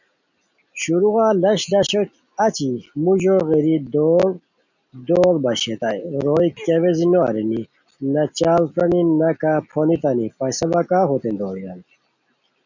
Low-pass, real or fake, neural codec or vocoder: 7.2 kHz; real; none